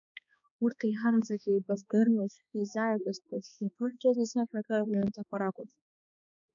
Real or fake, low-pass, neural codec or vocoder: fake; 7.2 kHz; codec, 16 kHz, 2 kbps, X-Codec, HuBERT features, trained on balanced general audio